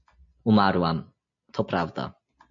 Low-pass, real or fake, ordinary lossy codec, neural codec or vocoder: 7.2 kHz; real; MP3, 48 kbps; none